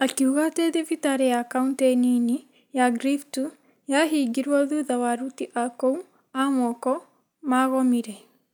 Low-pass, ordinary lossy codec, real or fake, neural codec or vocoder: none; none; real; none